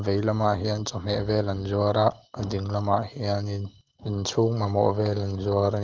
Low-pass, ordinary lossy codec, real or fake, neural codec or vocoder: 7.2 kHz; Opus, 16 kbps; real; none